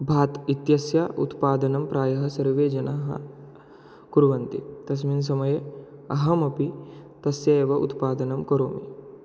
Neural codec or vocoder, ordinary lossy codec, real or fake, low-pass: none; none; real; none